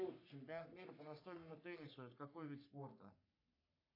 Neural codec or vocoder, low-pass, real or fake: codec, 44.1 kHz, 3.4 kbps, Pupu-Codec; 5.4 kHz; fake